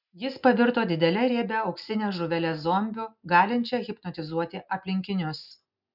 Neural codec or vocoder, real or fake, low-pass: none; real; 5.4 kHz